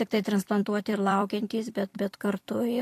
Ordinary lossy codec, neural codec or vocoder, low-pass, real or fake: AAC, 64 kbps; vocoder, 44.1 kHz, 128 mel bands every 256 samples, BigVGAN v2; 14.4 kHz; fake